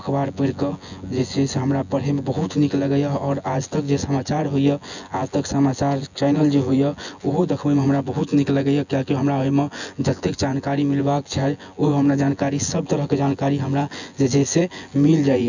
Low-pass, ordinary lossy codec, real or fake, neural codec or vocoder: 7.2 kHz; none; fake; vocoder, 24 kHz, 100 mel bands, Vocos